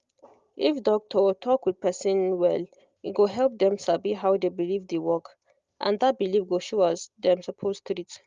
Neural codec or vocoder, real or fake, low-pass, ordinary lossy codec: none; real; 7.2 kHz; Opus, 32 kbps